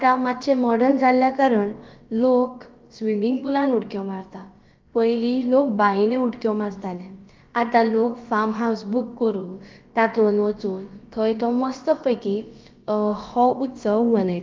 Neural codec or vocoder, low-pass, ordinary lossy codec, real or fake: codec, 16 kHz, about 1 kbps, DyCAST, with the encoder's durations; 7.2 kHz; Opus, 32 kbps; fake